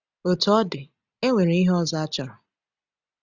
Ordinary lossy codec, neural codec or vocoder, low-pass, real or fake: none; none; 7.2 kHz; real